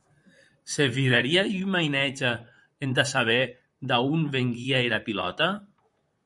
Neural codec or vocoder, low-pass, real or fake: vocoder, 44.1 kHz, 128 mel bands, Pupu-Vocoder; 10.8 kHz; fake